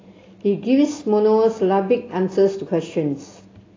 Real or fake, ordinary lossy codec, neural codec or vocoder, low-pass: real; AAC, 32 kbps; none; 7.2 kHz